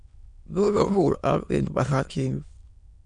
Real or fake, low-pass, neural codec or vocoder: fake; 9.9 kHz; autoencoder, 22.05 kHz, a latent of 192 numbers a frame, VITS, trained on many speakers